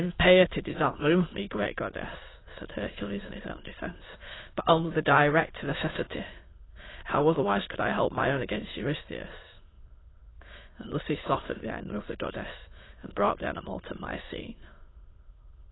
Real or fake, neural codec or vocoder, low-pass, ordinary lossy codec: fake; autoencoder, 22.05 kHz, a latent of 192 numbers a frame, VITS, trained on many speakers; 7.2 kHz; AAC, 16 kbps